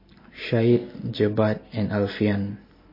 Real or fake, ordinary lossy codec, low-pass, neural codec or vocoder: real; MP3, 24 kbps; 5.4 kHz; none